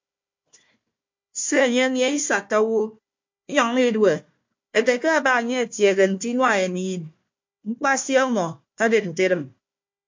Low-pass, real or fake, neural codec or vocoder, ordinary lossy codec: 7.2 kHz; fake; codec, 16 kHz, 1 kbps, FunCodec, trained on Chinese and English, 50 frames a second; MP3, 48 kbps